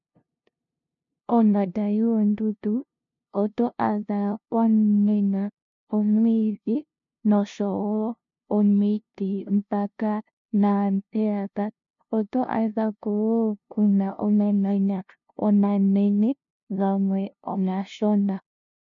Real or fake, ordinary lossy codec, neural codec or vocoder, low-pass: fake; MP3, 64 kbps; codec, 16 kHz, 0.5 kbps, FunCodec, trained on LibriTTS, 25 frames a second; 7.2 kHz